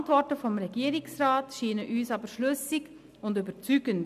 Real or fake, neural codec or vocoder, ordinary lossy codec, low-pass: real; none; none; 14.4 kHz